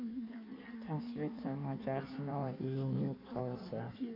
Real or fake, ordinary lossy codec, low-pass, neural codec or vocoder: fake; MP3, 48 kbps; 5.4 kHz; codec, 16 kHz in and 24 kHz out, 1.1 kbps, FireRedTTS-2 codec